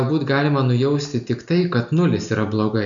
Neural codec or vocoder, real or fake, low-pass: none; real; 7.2 kHz